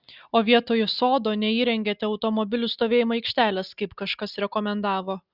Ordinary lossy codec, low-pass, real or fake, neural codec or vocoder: Opus, 64 kbps; 5.4 kHz; real; none